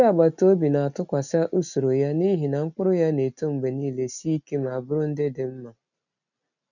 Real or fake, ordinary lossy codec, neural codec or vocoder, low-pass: real; none; none; 7.2 kHz